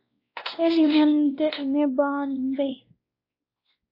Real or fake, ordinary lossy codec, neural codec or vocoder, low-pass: fake; AAC, 32 kbps; codec, 16 kHz, 1 kbps, X-Codec, WavLM features, trained on Multilingual LibriSpeech; 5.4 kHz